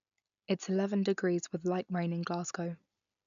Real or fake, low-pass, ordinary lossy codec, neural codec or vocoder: real; 7.2 kHz; none; none